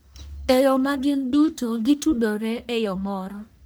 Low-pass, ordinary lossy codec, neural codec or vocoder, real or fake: none; none; codec, 44.1 kHz, 1.7 kbps, Pupu-Codec; fake